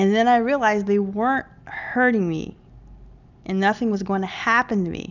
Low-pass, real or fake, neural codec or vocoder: 7.2 kHz; real; none